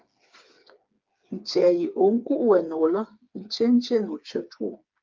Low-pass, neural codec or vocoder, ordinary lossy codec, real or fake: 7.2 kHz; codec, 16 kHz, 4 kbps, FreqCodec, smaller model; Opus, 24 kbps; fake